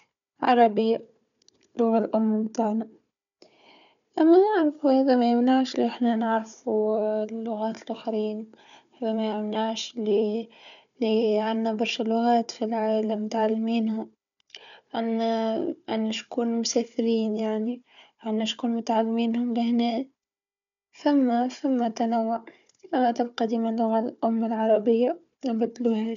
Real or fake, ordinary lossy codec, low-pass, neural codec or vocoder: fake; none; 7.2 kHz; codec, 16 kHz, 4 kbps, FunCodec, trained on Chinese and English, 50 frames a second